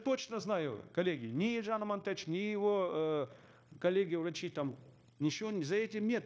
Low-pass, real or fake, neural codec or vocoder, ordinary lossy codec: none; fake; codec, 16 kHz, 0.9 kbps, LongCat-Audio-Codec; none